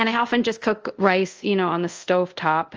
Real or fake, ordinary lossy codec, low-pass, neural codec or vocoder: fake; Opus, 24 kbps; 7.2 kHz; codec, 24 kHz, 0.5 kbps, DualCodec